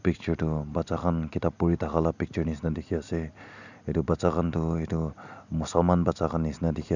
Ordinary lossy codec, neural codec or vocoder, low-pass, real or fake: none; none; 7.2 kHz; real